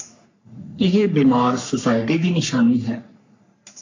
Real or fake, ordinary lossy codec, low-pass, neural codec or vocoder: fake; AAC, 48 kbps; 7.2 kHz; codec, 44.1 kHz, 3.4 kbps, Pupu-Codec